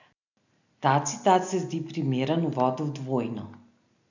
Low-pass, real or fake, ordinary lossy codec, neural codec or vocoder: 7.2 kHz; real; none; none